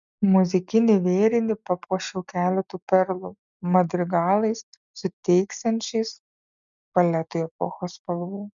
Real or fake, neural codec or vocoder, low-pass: real; none; 7.2 kHz